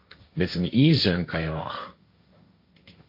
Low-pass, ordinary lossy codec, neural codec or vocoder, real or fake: 5.4 kHz; MP3, 32 kbps; codec, 16 kHz, 1.1 kbps, Voila-Tokenizer; fake